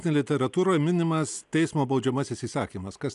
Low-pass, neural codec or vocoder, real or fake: 10.8 kHz; none; real